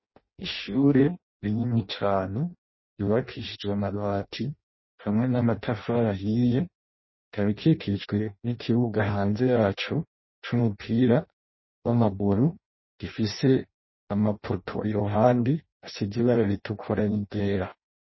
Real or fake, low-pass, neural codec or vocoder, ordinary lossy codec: fake; 7.2 kHz; codec, 16 kHz in and 24 kHz out, 0.6 kbps, FireRedTTS-2 codec; MP3, 24 kbps